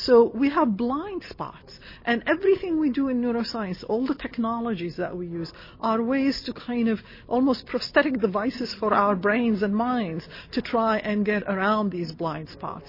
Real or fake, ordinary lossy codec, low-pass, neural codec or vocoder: real; MP3, 24 kbps; 5.4 kHz; none